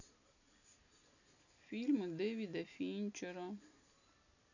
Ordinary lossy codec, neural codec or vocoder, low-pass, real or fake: none; none; 7.2 kHz; real